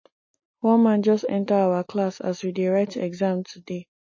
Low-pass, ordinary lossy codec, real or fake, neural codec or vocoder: 7.2 kHz; MP3, 32 kbps; real; none